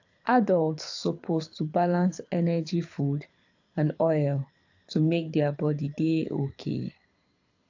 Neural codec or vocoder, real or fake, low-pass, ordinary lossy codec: codec, 24 kHz, 6 kbps, HILCodec; fake; 7.2 kHz; AAC, 48 kbps